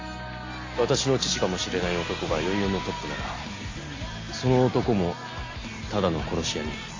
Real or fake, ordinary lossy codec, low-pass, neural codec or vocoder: real; AAC, 32 kbps; 7.2 kHz; none